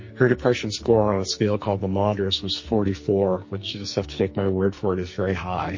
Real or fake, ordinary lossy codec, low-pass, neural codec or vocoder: fake; MP3, 32 kbps; 7.2 kHz; codec, 44.1 kHz, 2.6 kbps, SNAC